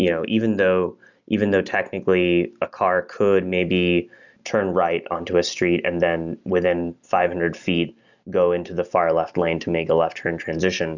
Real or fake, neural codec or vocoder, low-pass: real; none; 7.2 kHz